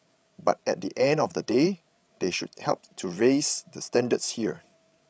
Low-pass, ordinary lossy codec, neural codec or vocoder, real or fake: none; none; codec, 16 kHz, 16 kbps, FreqCodec, larger model; fake